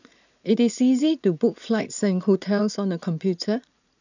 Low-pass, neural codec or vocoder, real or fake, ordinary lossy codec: 7.2 kHz; vocoder, 44.1 kHz, 80 mel bands, Vocos; fake; none